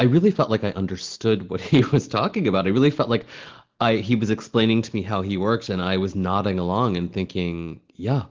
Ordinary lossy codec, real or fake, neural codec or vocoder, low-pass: Opus, 16 kbps; real; none; 7.2 kHz